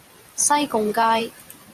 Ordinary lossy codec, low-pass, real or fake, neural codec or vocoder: Opus, 64 kbps; 14.4 kHz; fake; vocoder, 44.1 kHz, 128 mel bands every 512 samples, BigVGAN v2